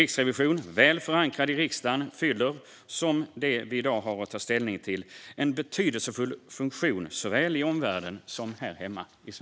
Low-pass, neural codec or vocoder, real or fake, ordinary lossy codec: none; none; real; none